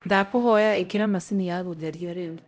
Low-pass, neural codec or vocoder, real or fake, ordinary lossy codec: none; codec, 16 kHz, 0.5 kbps, X-Codec, HuBERT features, trained on LibriSpeech; fake; none